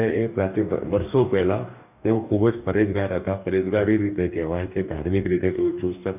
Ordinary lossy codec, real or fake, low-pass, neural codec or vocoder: none; fake; 3.6 kHz; codec, 44.1 kHz, 2.6 kbps, DAC